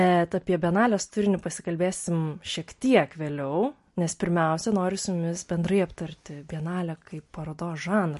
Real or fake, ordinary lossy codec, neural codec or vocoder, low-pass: real; MP3, 48 kbps; none; 14.4 kHz